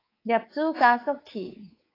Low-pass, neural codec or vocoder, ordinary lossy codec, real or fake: 5.4 kHz; codec, 24 kHz, 3.1 kbps, DualCodec; AAC, 24 kbps; fake